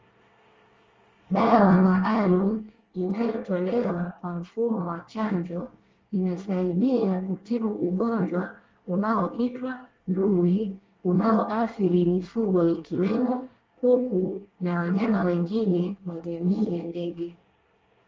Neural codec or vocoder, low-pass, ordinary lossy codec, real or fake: codec, 24 kHz, 1 kbps, SNAC; 7.2 kHz; Opus, 32 kbps; fake